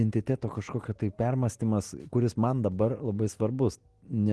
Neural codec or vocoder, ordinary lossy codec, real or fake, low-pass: none; Opus, 16 kbps; real; 10.8 kHz